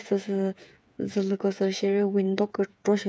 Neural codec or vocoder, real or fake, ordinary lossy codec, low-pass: codec, 16 kHz, 8 kbps, FreqCodec, smaller model; fake; none; none